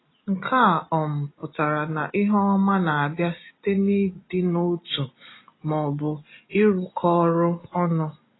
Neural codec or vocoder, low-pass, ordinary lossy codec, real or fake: none; 7.2 kHz; AAC, 16 kbps; real